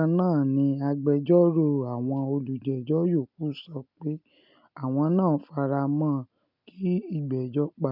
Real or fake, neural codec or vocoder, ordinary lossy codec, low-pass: real; none; none; 5.4 kHz